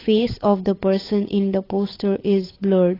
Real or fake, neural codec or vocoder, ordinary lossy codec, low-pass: fake; codec, 16 kHz, 8 kbps, FunCodec, trained on Chinese and English, 25 frames a second; AAC, 24 kbps; 5.4 kHz